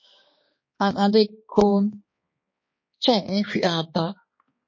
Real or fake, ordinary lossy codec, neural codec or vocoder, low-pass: fake; MP3, 32 kbps; codec, 16 kHz, 2 kbps, X-Codec, HuBERT features, trained on balanced general audio; 7.2 kHz